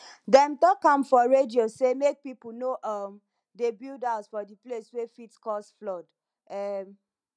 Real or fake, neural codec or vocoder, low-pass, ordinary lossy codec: real; none; 9.9 kHz; none